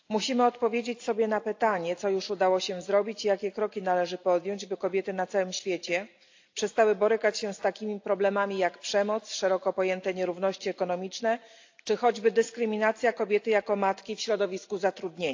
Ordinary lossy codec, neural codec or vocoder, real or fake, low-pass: AAC, 48 kbps; none; real; 7.2 kHz